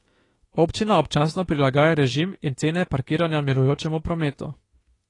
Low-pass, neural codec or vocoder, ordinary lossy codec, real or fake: 10.8 kHz; autoencoder, 48 kHz, 32 numbers a frame, DAC-VAE, trained on Japanese speech; AAC, 32 kbps; fake